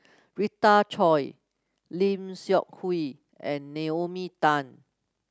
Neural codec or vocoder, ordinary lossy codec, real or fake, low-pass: none; none; real; none